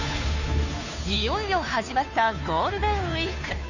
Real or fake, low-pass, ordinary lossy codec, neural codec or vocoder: fake; 7.2 kHz; none; codec, 16 kHz, 2 kbps, FunCodec, trained on Chinese and English, 25 frames a second